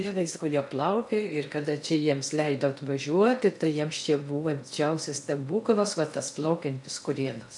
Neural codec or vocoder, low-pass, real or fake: codec, 16 kHz in and 24 kHz out, 0.6 kbps, FocalCodec, streaming, 2048 codes; 10.8 kHz; fake